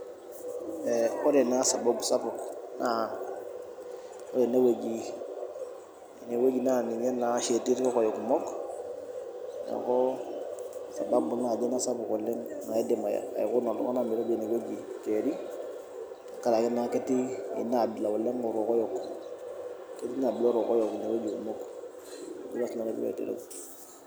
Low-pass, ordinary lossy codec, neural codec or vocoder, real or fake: none; none; none; real